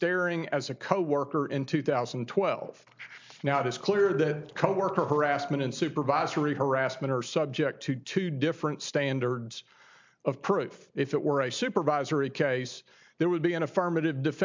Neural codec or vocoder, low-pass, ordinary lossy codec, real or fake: none; 7.2 kHz; MP3, 64 kbps; real